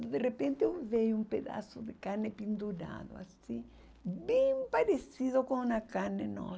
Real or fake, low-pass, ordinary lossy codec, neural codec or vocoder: real; none; none; none